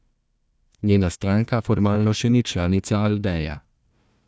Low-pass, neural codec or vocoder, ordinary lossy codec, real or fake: none; codec, 16 kHz, 1 kbps, FunCodec, trained on Chinese and English, 50 frames a second; none; fake